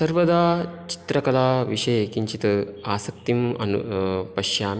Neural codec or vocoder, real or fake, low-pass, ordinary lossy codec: none; real; none; none